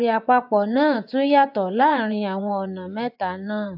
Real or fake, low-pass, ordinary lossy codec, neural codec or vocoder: fake; 5.4 kHz; AAC, 48 kbps; vocoder, 24 kHz, 100 mel bands, Vocos